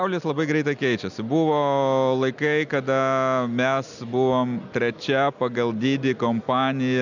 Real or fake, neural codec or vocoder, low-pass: real; none; 7.2 kHz